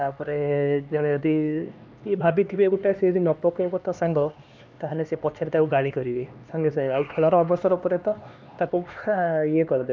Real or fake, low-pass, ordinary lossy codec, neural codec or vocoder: fake; none; none; codec, 16 kHz, 2 kbps, X-Codec, HuBERT features, trained on LibriSpeech